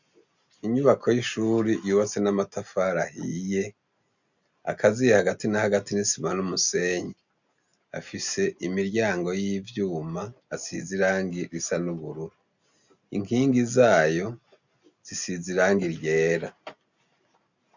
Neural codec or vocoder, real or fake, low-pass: none; real; 7.2 kHz